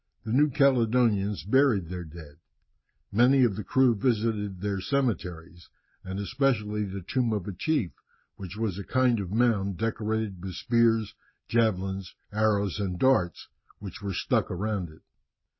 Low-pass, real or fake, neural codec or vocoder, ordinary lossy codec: 7.2 kHz; real; none; MP3, 24 kbps